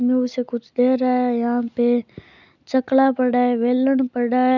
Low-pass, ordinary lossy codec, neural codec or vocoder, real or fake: 7.2 kHz; none; none; real